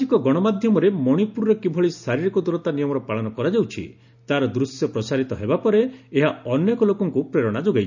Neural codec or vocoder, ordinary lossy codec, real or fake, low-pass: none; none; real; 7.2 kHz